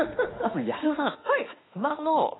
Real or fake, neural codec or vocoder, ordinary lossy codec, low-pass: fake; autoencoder, 48 kHz, 32 numbers a frame, DAC-VAE, trained on Japanese speech; AAC, 16 kbps; 7.2 kHz